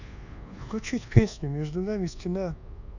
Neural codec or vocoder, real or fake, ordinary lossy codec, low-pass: codec, 24 kHz, 1.2 kbps, DualCodec; fake; none; 7.2 kHz